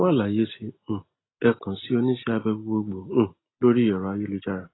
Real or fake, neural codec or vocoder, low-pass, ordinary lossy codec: real; none; 7.2 kHz; AAC, 16 kbps